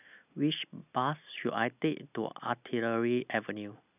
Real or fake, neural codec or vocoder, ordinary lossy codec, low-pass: real; none; none; 3.6 kHz